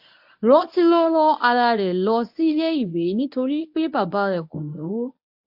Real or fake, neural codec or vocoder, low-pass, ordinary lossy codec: fake; codec, 24 kHz, 0.9 kbps, WavTokenizer, medium speech release version 1; 5.4 kHz; AAC, 48 kbps